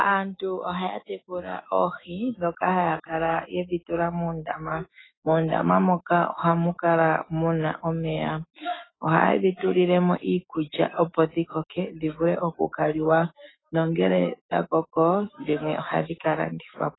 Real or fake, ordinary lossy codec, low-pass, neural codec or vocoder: fake; AAC, 16 kbps; 7.2 kHz; autoencoder, 48 kHz, 128 numbers a frame, DAC-VAE, trained on Japanese speech